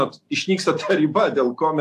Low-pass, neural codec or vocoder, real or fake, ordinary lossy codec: 14.4 kHz; none; real; AAC, 64 kbps